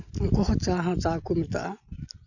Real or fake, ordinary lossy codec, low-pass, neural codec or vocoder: real; none; 7.2 kHz; none